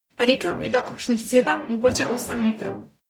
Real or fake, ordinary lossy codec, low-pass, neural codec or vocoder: fake; none; 19.8 kHz; codec, 44.1 kHz, 0.9 kbps, DAC